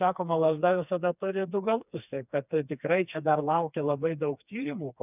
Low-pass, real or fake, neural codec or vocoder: 3.6 kHz; fake; codec, 16 kHz, 2 kbps, FreqCodec, smaller model